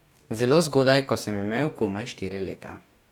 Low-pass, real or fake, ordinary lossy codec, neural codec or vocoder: 19.8 kHz; fake; none; codec, 44.1 kHz, 2.6 kbps, DAC